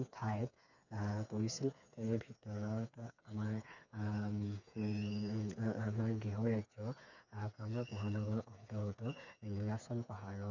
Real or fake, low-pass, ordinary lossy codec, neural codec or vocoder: fake; 7.2 kHz; none; codec, 16 kHz, 4 kbps, FreqCodec, smaller model